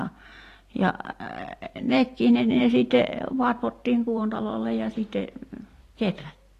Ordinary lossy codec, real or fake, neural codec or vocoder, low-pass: AAC, 48 kbps; fake; vocoder, 44.1 kHz, 128 mel bands every 512 samples, BigVGAN v2; 14.4 kHz